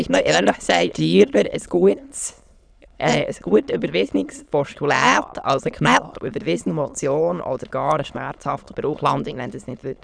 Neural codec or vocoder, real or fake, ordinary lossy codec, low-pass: autoencoder, 22.05 kHz, a latent of 192 numbers a frame, VITS, trained on many speakers; fake; none; 9.9 kHz